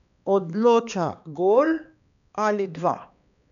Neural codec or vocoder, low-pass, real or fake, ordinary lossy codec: codec, 16 kHz, 2 kbps, X-Codec, HuBERT features, trained on balanced general audio; 7.2 kHz; fake; none